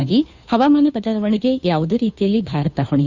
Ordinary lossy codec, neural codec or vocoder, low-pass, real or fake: none; codec, 16 kHz, 2 kbps, FunCodec, trained on Chinese and English, 25 frames a second; 7.2 kHz; fake